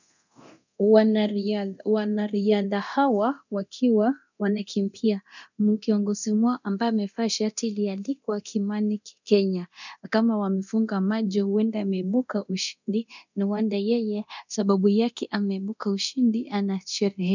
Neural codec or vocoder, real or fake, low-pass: codec, 24 kHz, 0.9 kbps, DualCodec; fake; 7.2 kHz